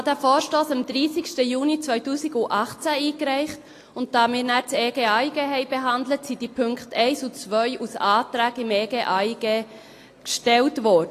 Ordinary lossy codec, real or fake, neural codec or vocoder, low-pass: AAC, 48 kbps; real; none; 14.4 kHz